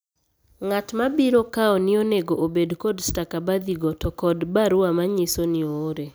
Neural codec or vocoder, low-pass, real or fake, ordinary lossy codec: none; none; real; none